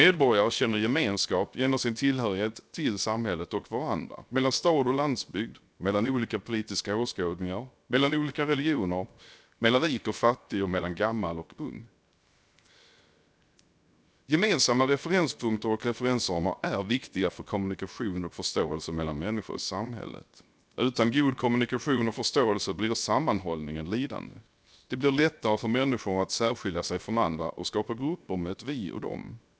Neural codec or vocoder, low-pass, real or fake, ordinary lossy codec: codec, 16 kHz, 0.7 kbps, FocalCodec; none; fake; none